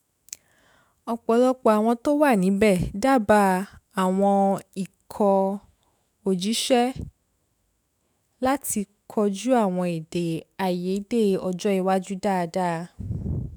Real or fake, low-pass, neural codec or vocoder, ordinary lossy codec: fake; none; autoencoder, 48 kHz, 128 numbers a frame, DAC-VAE, trained on Japanese speech; none